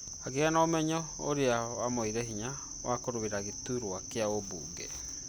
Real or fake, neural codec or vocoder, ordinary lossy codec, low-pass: real; none; none; none